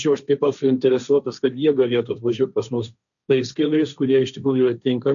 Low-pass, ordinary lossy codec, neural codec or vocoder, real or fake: 7.2 kHz; MP3, 96 kbps; codec, 16 kHz, 1.1 kbps, Voila-Tokenizer; fake